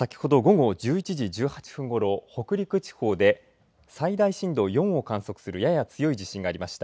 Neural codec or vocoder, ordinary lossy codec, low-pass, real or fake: none; none; none; real